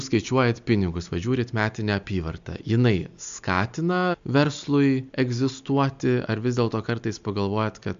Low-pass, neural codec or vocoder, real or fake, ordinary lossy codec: 7.2 kHz; none; real; MP3, 64 kbps